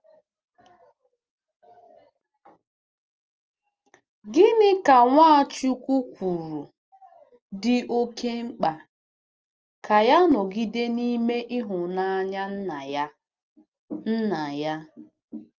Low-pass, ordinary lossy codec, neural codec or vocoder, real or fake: 7.2 kHz; Opus, 24 kbps; none; real